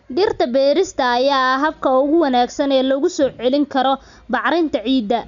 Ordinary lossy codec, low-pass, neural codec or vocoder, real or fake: none; 7.2 kHz; none; real